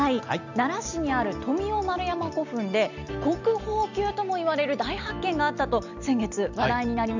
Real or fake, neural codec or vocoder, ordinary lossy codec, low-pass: real; none; none; 7.2 kHz